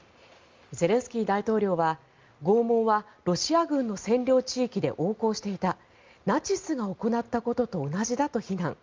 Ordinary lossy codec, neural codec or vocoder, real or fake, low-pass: Opus, 32 kbps; none; real; 7.2 kHz